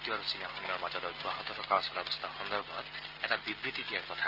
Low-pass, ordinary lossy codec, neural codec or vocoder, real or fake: 5.4 kHz; Opus, 32 kbps; none; real